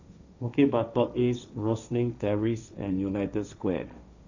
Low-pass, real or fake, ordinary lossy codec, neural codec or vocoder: 7.2 kHz; fake; none; codec, 16 kHz, 1.1 kbps, Voila-Tokenizer